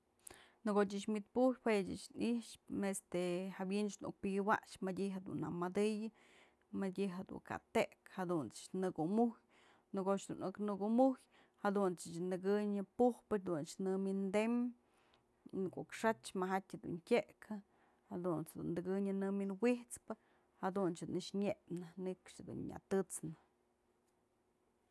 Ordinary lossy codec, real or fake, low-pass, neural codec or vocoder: none; real; none; none